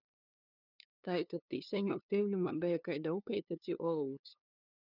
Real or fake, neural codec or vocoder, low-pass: fake; codec, 16 kHz, 2 kbps, FunCodec, trained on LibriTTS, 25 frames a second; 5.4 kHz